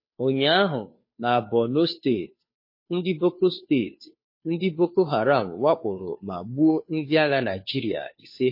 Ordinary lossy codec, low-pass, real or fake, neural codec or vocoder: MP3, 24 kbps; 5.4 kHz; fake; codec, 16 kHz, 2 kbps, FunCodec, trained on Chinese and English, 25 frames a second